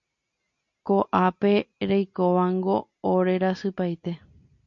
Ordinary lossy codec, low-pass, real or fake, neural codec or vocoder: MP3, 48 kbps; 7.2 kHz; real; none